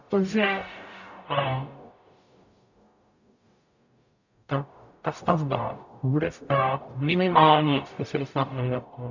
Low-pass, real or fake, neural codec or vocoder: 7.2 kHz; fake; codec, 44.1 kHz, 0.9 kbps, DAC